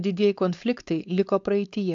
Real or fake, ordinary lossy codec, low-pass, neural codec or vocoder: fake; AAC, 64 kbps; 7.2 kHz; codec, 16 kHz, 4.8 kbps, FACodec